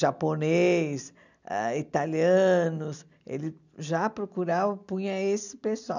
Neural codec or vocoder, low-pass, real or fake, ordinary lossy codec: none; 7.2 kHz; real; none